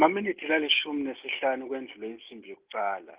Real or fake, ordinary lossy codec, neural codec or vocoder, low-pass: real; Opus, 32 kbps; none; 3.6 kHz